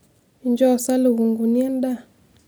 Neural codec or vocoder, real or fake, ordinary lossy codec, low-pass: none; real; none; none